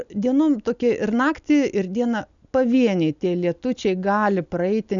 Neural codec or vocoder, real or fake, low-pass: none; real; 7.2 kHz